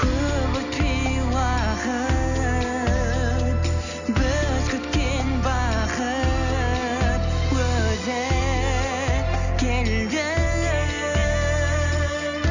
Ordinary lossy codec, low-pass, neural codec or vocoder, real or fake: none; 7.2 kHz; none; real